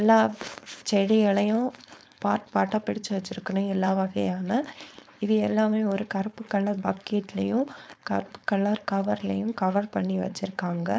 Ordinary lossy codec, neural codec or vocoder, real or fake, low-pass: none; codec, 16 kHz, 4.8 kbps, FACodec; fake; none